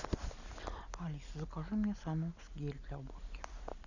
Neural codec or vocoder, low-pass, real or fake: none; 7.2 kHz; real